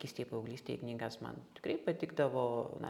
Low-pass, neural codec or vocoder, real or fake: 19.8 kHz; none; real